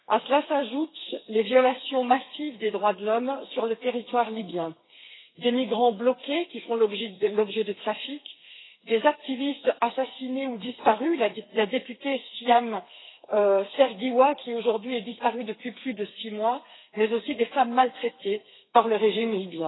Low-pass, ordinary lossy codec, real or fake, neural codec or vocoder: 7.2 kHz; AAC, 16 kbps; fake; codec, 32 kHz, 1.9 kbps, SNAC